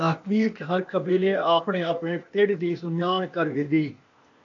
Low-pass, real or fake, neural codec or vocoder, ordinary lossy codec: 7.2 kHz; fake; codec, 16 kHz, 0.8 kbps, ZipCodec; AAC, 48 kbps